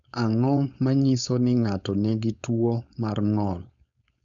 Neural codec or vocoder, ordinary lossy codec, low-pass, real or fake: codec, 16 kHz, 4.8 kbps, FACodec; none; 7.2 kHz; fake